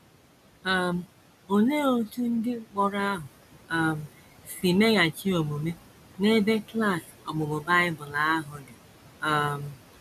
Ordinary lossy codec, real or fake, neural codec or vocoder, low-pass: none; real; none; 14.4 kHz